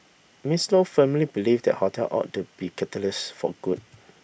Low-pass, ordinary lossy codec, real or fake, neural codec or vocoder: none; none; real; none